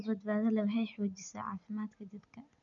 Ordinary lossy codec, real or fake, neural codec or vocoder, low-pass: none; real; none; 7.2 kHz